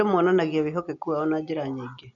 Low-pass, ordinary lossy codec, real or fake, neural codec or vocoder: 7.2 kHz; none; real; none